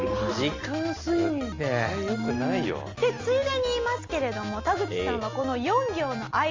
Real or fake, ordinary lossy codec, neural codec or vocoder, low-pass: real; Opus, 32 kbps; none; 7.2 kHz